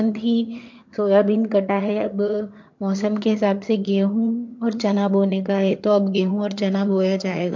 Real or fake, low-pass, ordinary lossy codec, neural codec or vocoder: fake; 7.2 kHz; MP3, 48 kbps; vocoder, 22.05 kHz, 80 mel bands, HiFi-GAN